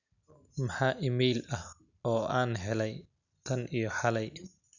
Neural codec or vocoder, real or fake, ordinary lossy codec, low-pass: none; real; none; 7.2 kHz